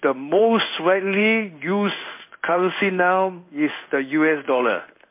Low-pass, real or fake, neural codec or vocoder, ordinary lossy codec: 3.6 kHz; fake; codec, 16 kHz in and 24 kHz out, 1 kbps, XY-Tokenizer; MP3, 32 kbps